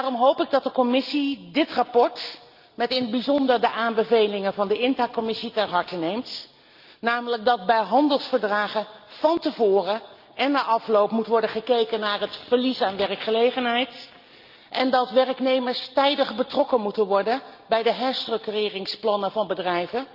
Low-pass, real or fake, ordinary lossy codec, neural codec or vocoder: 5.4 kHz; real; Opus, 24 kbps; none